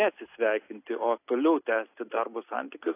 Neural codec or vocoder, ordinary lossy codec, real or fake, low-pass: none; AAC, 24 kbps; real; 3.6 kHz